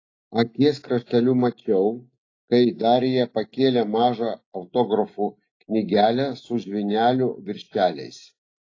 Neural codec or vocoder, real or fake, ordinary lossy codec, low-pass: none; real; AAC, 32 kbps; 7.2 kHz